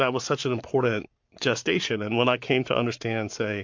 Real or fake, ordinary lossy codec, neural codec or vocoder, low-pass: fake; MP3, 48 kbps; codec, 44.1 kHz, 7.8 kbps, Pupu-Codec; 7.2 kHz